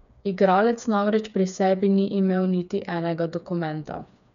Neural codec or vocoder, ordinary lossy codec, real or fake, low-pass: codec, 16 kHz, 4 kbps, FreqCodec, smaller model; none; fake; 7.2 kHz